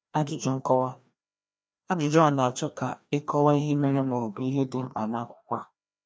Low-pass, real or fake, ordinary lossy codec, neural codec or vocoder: none; fake; none; codec, 16 kHz, 1 kbps, FreqCodec, larger model